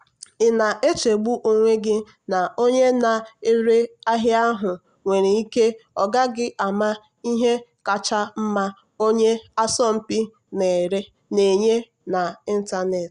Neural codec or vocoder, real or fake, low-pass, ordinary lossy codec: none; real; 9.9 kHz; Opus, 64 kbps